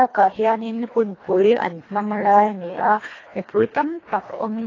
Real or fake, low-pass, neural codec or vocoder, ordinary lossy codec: fake; 7.2 kHz; codec, 24 kHz, 1.5 kbps, HILCodec; AAC, 32 kbps